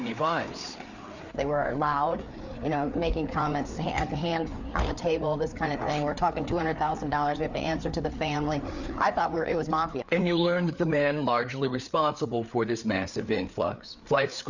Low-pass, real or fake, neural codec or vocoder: 7.2 kHz; fake; codec, 16 kHz, 4 kbps, FreqCodec, larger model